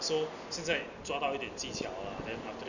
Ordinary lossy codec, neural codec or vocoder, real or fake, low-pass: none; none; real; 7.2 kHz